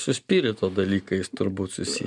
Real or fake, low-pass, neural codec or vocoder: real; 10.8 kHz; none